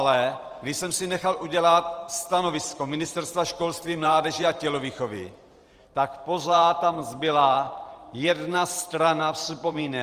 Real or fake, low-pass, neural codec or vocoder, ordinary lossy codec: fake; 14.4 kHz; vocoder, 44.1 kHz, 128 mel bands every 512 samples, BigVGAN v2; Opus, 24 kbps